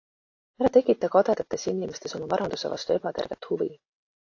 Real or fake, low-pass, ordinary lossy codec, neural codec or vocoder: real; 7.2 kHz; AAC, 48 kbps; none